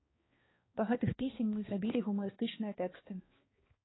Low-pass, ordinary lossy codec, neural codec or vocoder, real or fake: 7.2 kHz; AAC, 16 kbps; codec, 16 kHz, 2 kbps, X-Codec, HuBERT features, trained on balanced general audio; fake